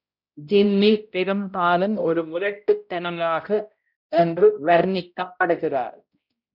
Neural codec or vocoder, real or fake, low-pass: codec, 16 kHz, 0.5 kbps, X-Codec, HuBERT features, trained on balanced general audio; fake; 5.4 kHz